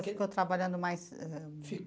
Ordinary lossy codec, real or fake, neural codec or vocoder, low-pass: none; real; none; none